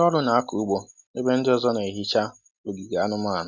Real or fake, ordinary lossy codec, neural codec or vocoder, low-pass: real; none; none; none